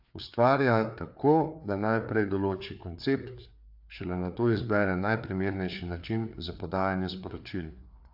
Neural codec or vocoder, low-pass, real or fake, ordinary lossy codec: codec, 16 kHz, 4 kbps, FreqCodec, larger model; 5.4 kHz; fake; AAC, 48 kbps